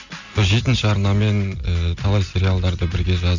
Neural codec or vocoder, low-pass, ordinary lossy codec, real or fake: none; 7.2 kHz; none; real